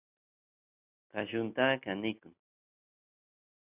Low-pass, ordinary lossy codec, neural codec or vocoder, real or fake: 3.6 kHz; Opus, 64 kbps; none; real